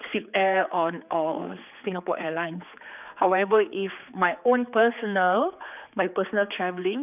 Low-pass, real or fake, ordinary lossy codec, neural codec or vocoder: 3.6 kHz; fake; none; codec, 16 kHz, 4 kbps, X-Codec, HuBERT features, trained on general audio